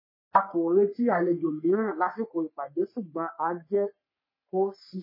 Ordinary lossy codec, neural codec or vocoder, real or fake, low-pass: MP3, 24 kbps; codec, 44.1 kHz, 3.4 kbps, Pupu-Codec; fake; 5.4 kHz